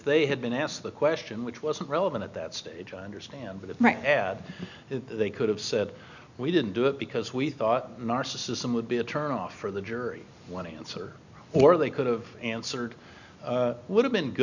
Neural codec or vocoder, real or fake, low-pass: none; real; 7.2 kHz